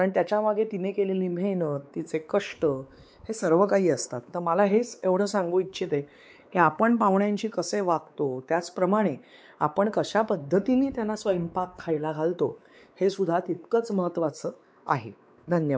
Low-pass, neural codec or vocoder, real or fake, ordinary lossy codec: none; codec, 16 kHz, 4 kbps, X-Codec, WavLM features, trained on Multilingual LibriSpeech; fake; none